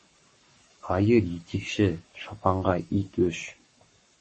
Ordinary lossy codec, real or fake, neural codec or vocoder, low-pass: MP3, 32 kbps; fake; codec, 44.1 kHz, 7.8 kbps, Pupu-Codec; 10.8 kHz